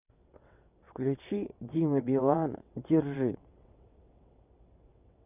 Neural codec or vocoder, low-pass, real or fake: vocoder, 22.05 kHz, 80 mel bands, WaveNeXt; 3.6 kHz; fake